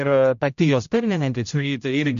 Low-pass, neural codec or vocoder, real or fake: 7.2 kHz; codec, 16 kHz, 0.5 kbps, X-Codec, HuBERT features, trained on general audio; fake